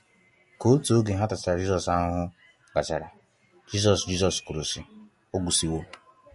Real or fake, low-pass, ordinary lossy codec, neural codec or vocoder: real; 14.4 kHz; MP3, 48 kbps; none